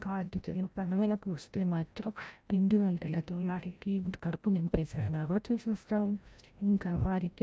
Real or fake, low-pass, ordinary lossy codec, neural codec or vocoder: fake; none; none; codec, 16 kHz, 0.5 kbps, FreqCodec, larger model